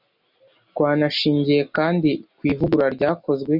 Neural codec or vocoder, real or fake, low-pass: none; real; 5.4 kHz